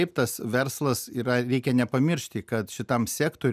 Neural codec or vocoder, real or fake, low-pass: none; real; 14.4 kHz